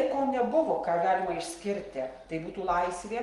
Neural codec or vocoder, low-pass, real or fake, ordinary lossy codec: none; 10.8 kHz; real; Opus, 24 kbps